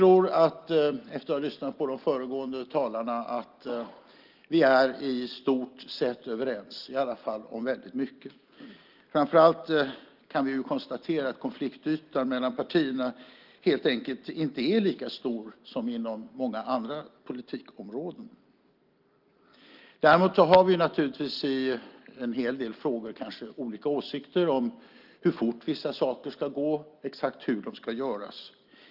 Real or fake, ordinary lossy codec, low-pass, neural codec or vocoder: real; Opus, 32 kbps; 5.4 kHz; none